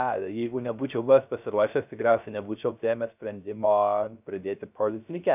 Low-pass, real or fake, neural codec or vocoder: 3.6 kHz; fake; codec, 16 kHz, 0.3 kbps, FocalCodec